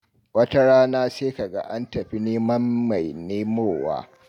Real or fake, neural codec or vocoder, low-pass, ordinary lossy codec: real; none; 19.8 kHz; none